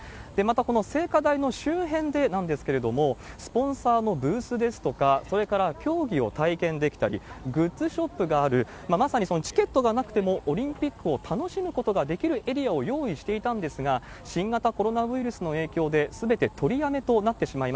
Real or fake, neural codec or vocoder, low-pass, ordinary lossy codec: real; none; none; none